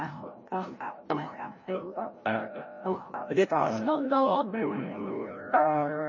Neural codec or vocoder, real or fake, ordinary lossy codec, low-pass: codec, 16 kHz, 0.5 kbps, FreqCodec, larger model; fake; MP3, 32 kbps; 7.2 kHz